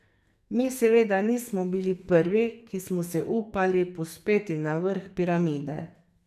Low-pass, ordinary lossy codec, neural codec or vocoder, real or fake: 14.4 kHz; none; codec, 44.1 kHz, 2.6 kbps, SNAC; fake